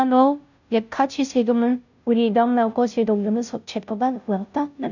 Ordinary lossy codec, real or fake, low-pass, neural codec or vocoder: none; fake; 7.2 kHz; codec, 16 kHz, 0.5 kbps, FunCodec, trained on Chinese and English, 25 frames a second